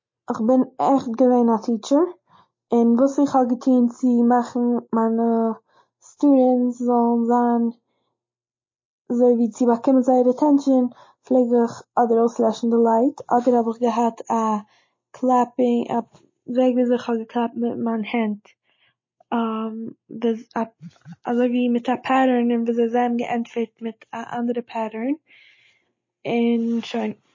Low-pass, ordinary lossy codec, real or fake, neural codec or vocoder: 7.2 kHz; MP3, 32 kbps; real; none